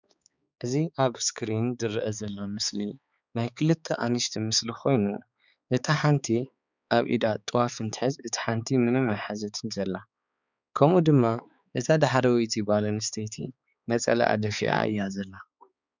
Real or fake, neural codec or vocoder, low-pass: fake; codec, 16 kHz, 4 kbps, X-Codec, HuBERT features, trained on balanced general audio; 7.2 kHz